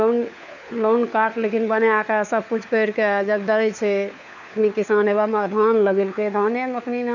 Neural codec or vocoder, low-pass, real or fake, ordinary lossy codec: codec, 16 kHz, 4 kbps, FunCodec, trained on LibriTTS, 50 frames a second; 7.2 kHz; fake; none